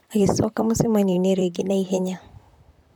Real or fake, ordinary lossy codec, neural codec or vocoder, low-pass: fake; none; vocoder, 44.1 kHz, 128 mel bands, Pupu-Vocoder; 19.8 kHz